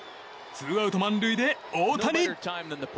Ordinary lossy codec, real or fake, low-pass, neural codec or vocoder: none; real; none; none